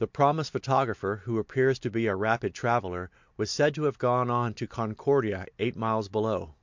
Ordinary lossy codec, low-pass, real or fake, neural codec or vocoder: MP3, 64 kbps; 7.2 kHz; real; none